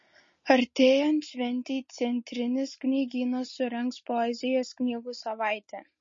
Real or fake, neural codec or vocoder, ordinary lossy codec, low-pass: real; none; MP3, 32 kbps; 7.2 kHz